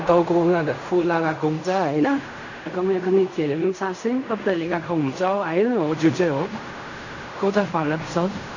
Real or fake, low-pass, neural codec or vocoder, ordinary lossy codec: fake; 7.2 kHz; codec, 16 kHz in and 24 kHz out, 0.4 kbps, LongCat-Audio-Codec, fine tuned four codebook decoder; none